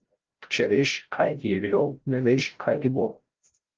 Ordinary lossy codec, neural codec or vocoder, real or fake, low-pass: Opus, 16 kbps; codec, 16 kHz, 0.5 kbps, FreqCodec, larger model; fake; 7.2 kHz